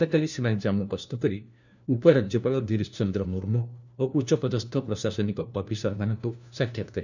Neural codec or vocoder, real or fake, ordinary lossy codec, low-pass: codec, 16 kHz, 1 kbps, FunCodec, trained on LibriTTS, 50 frames a second; fake; none; 7.2 kHz